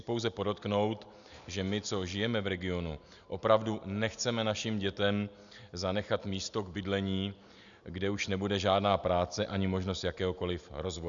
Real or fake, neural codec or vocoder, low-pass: real; none; 7.2 kHz